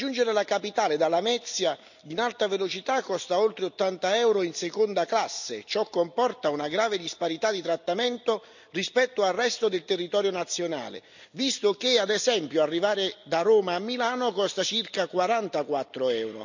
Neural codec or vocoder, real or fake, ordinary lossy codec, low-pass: none; real; none; 7.2 kHz